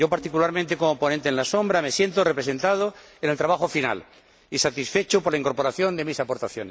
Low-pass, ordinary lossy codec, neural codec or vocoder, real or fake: none; none; none; real